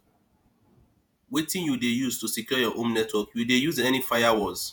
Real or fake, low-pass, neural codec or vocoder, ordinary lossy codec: fake; none; vocoder, 48 kHz, 128 mel bands, Vocos; none